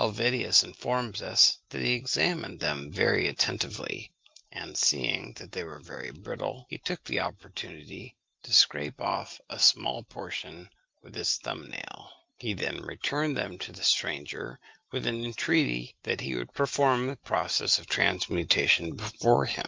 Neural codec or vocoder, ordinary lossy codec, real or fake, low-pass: none; Opus, 32 kbps; real; 7.2 kHz